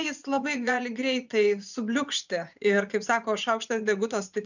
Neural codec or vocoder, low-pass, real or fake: vocoder, 24 kHz, 100 mel bands, Vocos; 7.2 kHz; fake